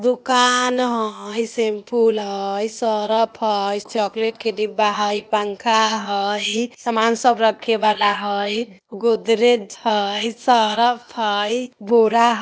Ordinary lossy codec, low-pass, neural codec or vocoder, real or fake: none; none; codec, 16 kHz, 0.8 kbps, ZipCodec; fake